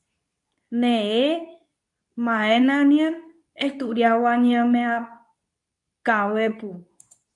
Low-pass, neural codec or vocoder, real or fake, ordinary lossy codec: 10.8 kHz; codec, 24 kHz, 0.9 kbps, WavTokenizer, medium speech release version 2; fake; AAC, 64 kbps